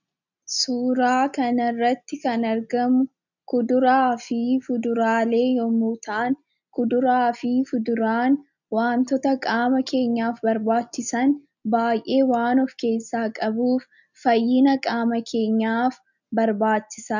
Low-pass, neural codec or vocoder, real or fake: 7.2 kHz; none; real